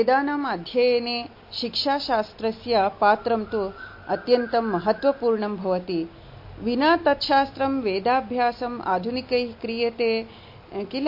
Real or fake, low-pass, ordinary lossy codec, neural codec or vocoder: real; 5.4 kHz; MP3, 32 kbps; none